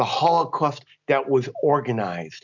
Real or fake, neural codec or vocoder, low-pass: real; none; 7.2 kHz